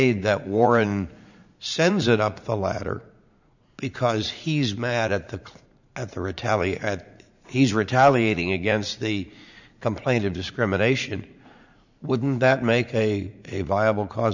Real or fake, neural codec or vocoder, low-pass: fake; vocoder, 44.1 kHz, 80 mel bands, Vocos; 7.2 kHz